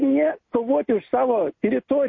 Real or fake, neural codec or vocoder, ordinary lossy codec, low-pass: real; none; MP3, 32 kbps; 7.2 kHz